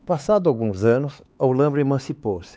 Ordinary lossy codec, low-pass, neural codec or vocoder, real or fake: none; none; codec, 16 kHz, 4 kbps, X-Codec, HuBERT features, trained on LibriSpeech; fake